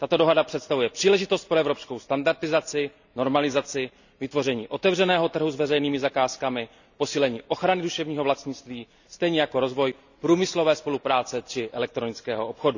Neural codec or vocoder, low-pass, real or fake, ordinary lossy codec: none; 7.2 kHz; real; none